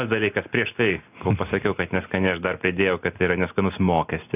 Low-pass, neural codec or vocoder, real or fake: 3.6 kHz; none; real